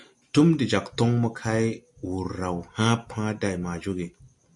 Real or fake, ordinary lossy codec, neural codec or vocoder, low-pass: real; MP3, 64 kbps; none; 10.8 kHz